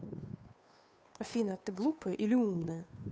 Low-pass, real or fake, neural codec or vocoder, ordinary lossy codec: none; fake; codec, 16 kHz, 2 kbps, FunCodec, trained on Chinese and English, 25 frames a second; none